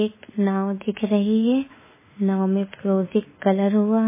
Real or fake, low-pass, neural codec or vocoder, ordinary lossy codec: fake; 3.6 kHz; autoencoder, 48 kHz, 32 numbers a frame, DAC-VAE, trained on Japanese speech; MP3, 16 kbps